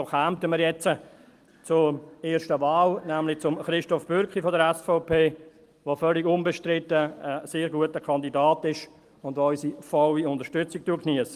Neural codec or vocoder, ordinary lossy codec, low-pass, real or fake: none; Opus, 24 kbps; 14.4 kHz; real